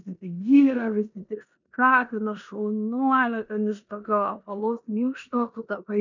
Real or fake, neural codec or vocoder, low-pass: fake; codec, 16 kHz in and 24 kHz out, 0.9 kbps, LongCat-Audio-Codec, four codebook decoder; 7.2 kHz